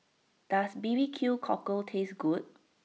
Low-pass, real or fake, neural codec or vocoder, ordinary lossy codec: none; real; none; none